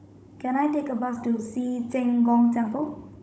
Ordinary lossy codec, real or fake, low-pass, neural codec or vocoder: none; fake; none; codec, 16 kHz, 16 kbps, FunCodec, trained on Chinese and English, 50 frames a second